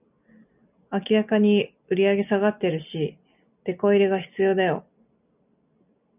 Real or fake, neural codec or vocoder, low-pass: real; none; 3.6 kHz